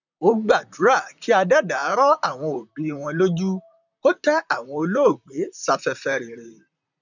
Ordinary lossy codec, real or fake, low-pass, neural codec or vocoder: none; fake; 7.2 kHz; vocoder, 44.1 kHz, 128 mel bands, Pupu-Vocoder